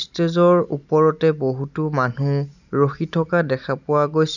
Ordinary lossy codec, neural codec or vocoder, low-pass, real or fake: none; none; 7.2 kHz; real